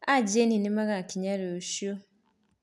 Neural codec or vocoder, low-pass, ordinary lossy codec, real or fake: none; none; none; real